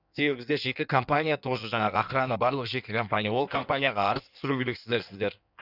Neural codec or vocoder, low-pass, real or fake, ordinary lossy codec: codec, 16 kHz in and 24 kHz out, 1.1 kbps, FireRedTTS-2 codec; 5.4 kHz; fake; none